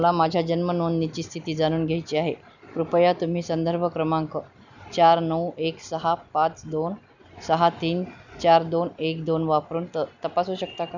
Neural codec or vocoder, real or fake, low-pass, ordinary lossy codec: none; real; 7.2 kHz; none